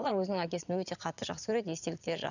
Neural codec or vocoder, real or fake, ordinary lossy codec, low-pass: vocoder, 22.05 kHz, 80 mel bands, Vocos; fake; none; 7.2 kHz